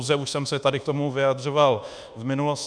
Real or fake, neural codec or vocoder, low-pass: fake; codec, 24 kHz, 1.2 kbps, DualCodec; 9.9 kHz